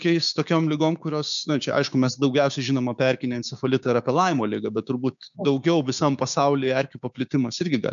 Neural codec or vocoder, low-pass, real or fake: codec, 16 kHz, 6 kbps, DAC; 7.2 kHz; fake